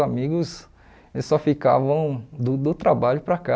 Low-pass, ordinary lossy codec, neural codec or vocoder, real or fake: none; none; none; real